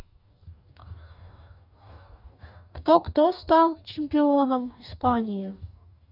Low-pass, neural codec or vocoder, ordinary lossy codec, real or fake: 5.4 kHz; codec, 44.1 kHz, 2.6 kbps, DAC; none; fake